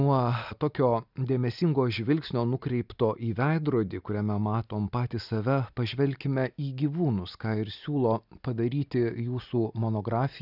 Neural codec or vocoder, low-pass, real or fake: none; 5.4 kHz; real